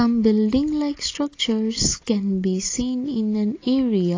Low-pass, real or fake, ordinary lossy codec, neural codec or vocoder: 7.2 kHz; real; AAC, 32 kbps; none